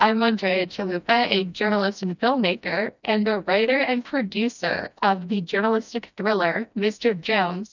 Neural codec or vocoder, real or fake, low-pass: codec, 16 kHz, 1 kbps, FreqCodec, smaller model; fake; 7.2 kHz